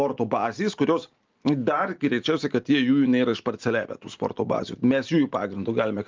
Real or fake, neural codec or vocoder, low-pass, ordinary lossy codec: real; none; 7.2 kHz; Opus, 32 kbps